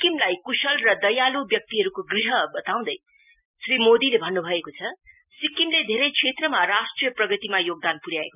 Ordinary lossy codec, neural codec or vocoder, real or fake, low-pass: none; none; real; 3.6 kHz